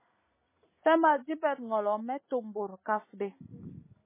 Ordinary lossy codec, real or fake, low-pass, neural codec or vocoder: MP3, 16 kbps; real; 3.6 kHz; none